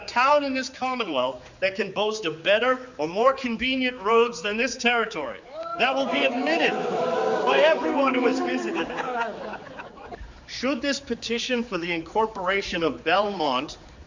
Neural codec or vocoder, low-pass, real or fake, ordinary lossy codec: codec, 16 kHz, 4 kbps, X-Codec, HuBERT features, trained on general audio; 7.2 kHz; fake; Opus, 64 kbps